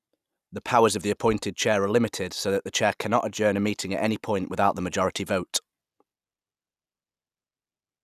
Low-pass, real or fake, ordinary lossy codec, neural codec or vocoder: 14.4 kHz; real; none; none